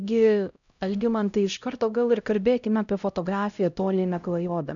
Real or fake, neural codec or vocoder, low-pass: fake; codec, 16 kHz, 0.5 kbps, X-Codec, HuBERT features, trained on LibriSpeech; 7.2 kHz